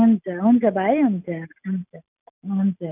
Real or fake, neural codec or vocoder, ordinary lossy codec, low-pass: real; none; none; 3.6 kHz